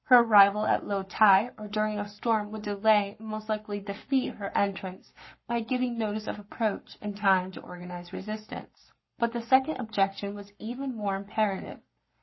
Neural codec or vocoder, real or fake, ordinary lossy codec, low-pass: codec, 44.1 kHz, 7.8 kbps, Pupu-Codec; fake; MP3, 24 kbps; 7.2 kHz